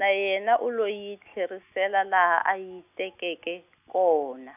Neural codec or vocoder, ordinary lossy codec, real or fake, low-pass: none; none; real; 3.6 kHz